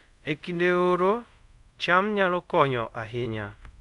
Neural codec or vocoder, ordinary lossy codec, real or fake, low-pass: codec, 24 kHz, 0.5 kbps, DualCodec; none; fake; 10.8 kHz